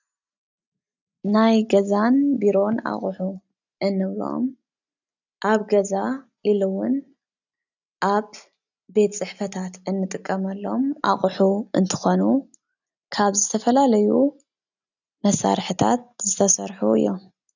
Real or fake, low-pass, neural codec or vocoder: real; 7.2 kHz; none